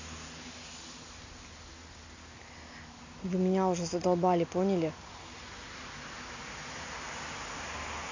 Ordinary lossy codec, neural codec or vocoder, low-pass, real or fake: AAC, 48 kbps; none; 7.2 kHz; real